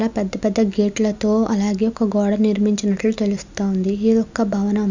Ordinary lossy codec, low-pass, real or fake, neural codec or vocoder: none; 7.2 kHz; real; none